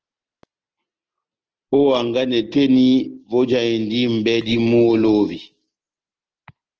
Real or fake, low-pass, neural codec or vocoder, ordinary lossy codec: real; 7.2 kHz; none; Opus, 16 kbps